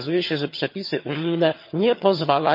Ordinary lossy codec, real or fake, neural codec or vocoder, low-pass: MP3, 32 kbps; fake; vocoder, 22.05 kHz, 80 mel bands, HiFi-GAN; 5.4 kHz